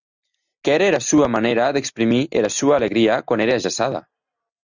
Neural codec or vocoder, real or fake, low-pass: none; real; 7.2 kHz